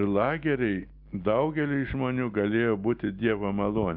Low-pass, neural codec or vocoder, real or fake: 5.4 kHz; none; real